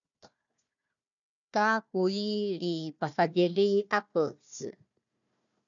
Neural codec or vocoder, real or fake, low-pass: codec, 16 kHz, 1 kbps, FunCodec, trained on Chinese and English, 50 frames a second; fake; 7.2 kHz